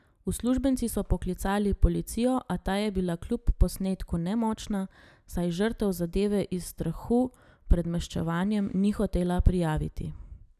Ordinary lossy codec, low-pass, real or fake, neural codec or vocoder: none; 14.4 kHz; real; none